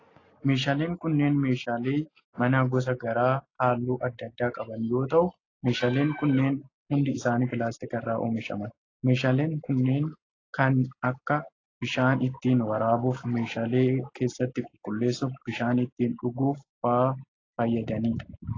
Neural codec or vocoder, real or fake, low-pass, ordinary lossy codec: none; real; 7.2 kHz; AAC, 32 kbps